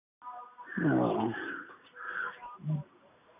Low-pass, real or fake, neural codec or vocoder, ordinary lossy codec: 3.6 kHz; real; none; none